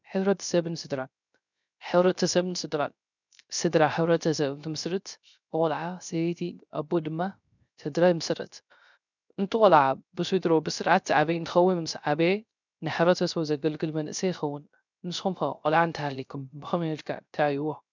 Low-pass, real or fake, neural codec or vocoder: 7.2 kHz; fake; codec, 16 kHz, 0.3 kbps, FocalCodec